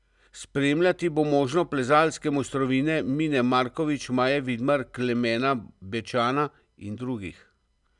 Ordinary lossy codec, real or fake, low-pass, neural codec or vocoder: none; real; 10.8 kHz; none